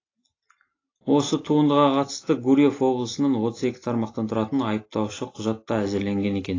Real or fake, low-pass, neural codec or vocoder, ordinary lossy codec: real; 7.2 kHz; none; AAC, 32 kbps